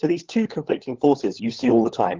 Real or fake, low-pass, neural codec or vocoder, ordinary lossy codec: fake; 7.2 kHz; codec, 16 kHz, 16 kbps, FunCodec, trained on LibriTTS, 50 frames a second; Opus, 16 kbps